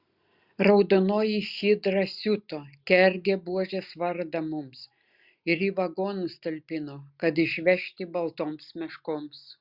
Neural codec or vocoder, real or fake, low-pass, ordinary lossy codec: none; real; 5.4 kHz; Opus, 64 kbps